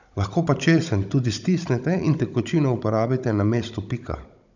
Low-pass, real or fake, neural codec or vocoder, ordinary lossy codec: 7.2 kHz; fake; codec, 16 kHz, 16 kbps, FunCodec, trained on Chinese and English, 50 frames a second; none